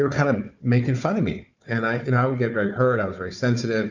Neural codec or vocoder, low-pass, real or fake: codec, 16 kHz, 4 kbps, FunCodec, trained on Chinese and English, 50 frames a second; 7.2 kHz; fake